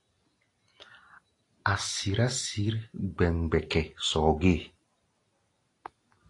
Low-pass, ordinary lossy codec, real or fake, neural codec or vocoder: 10.8 kHz; AAC, 48 kbps; real; none